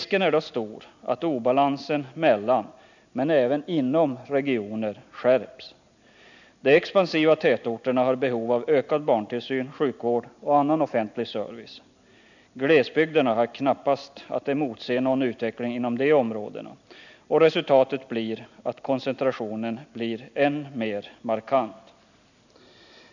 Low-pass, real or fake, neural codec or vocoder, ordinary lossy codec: 7.2 kHz; real; none; none